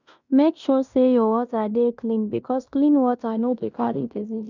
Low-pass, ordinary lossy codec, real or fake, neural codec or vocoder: 7.2 kHz; none; fake; codec, 16 kHz in and 24 kHz out, 0.9 kbps, LongCat-Audio-Codec, fine tuned four codebook decoder